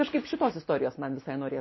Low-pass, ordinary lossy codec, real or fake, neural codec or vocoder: 7.2 kHz; MP3, 24 kbps; real; none